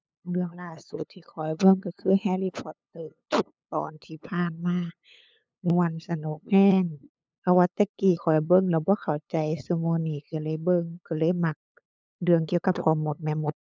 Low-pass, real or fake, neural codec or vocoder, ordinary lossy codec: none; fake; codec, 16 kHz, 8 kbps, FunCodec, trained on LibriTTS, 25 frames a second; none